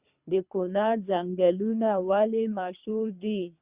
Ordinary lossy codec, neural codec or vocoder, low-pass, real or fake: Opus, 64 kbps; codec, 24 kHz, 3 kbps, HILCodec; 3.6 kHz; fake